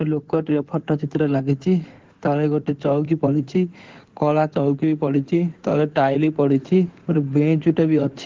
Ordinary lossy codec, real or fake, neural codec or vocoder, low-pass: Opus, 16 kbps; fake; codec, 16 kHz in and 24 kHz out, 2.2 kbps, FireRedTTS-2 codec; 7.2 kHz